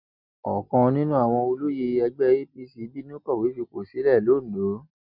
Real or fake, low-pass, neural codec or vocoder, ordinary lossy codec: real; 5.4 kHz; none; none